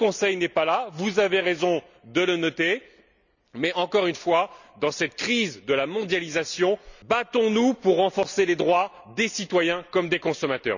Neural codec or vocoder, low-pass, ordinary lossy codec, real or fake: none; 7.2 kHz; none; real